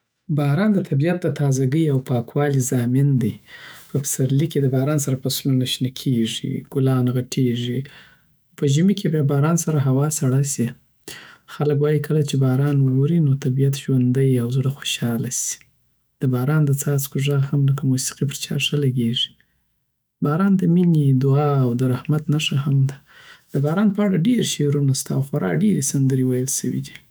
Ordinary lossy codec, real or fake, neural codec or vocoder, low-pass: none; fake; autoencoder, 48 kHz, 128 numbers a frame, DAC-VAE, trained on Japanese speech; none